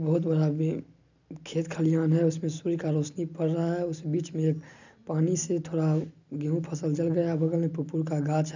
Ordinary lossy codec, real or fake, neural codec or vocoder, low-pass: none; real; none; 7.2 kHz